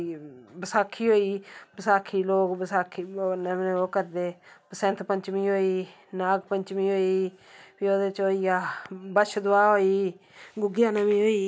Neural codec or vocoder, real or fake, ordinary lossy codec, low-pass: none; real; none; none